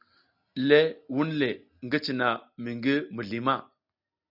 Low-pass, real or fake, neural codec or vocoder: 5.4 kHz; real; none